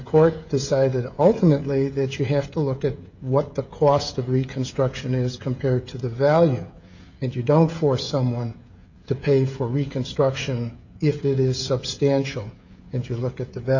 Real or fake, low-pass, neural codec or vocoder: fake; 7.2 kHz; codec, 16 kHz, 16 kbps, FreqCodec, smaller model